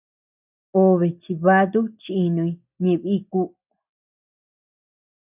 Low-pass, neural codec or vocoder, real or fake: 3.6 kHz; none; real